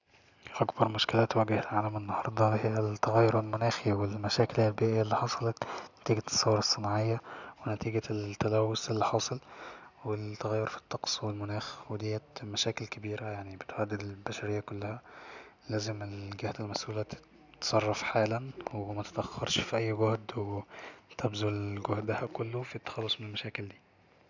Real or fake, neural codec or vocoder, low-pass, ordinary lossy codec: real; none; 7.2 kHz; none